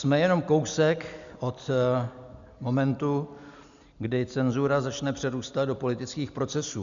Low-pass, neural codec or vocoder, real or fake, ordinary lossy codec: 7.2 kHz; none; real; MP3, 96 kbps